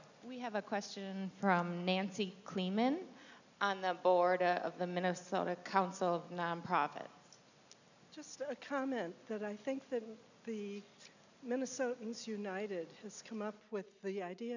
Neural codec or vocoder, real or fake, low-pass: none; real; 7.2 kHz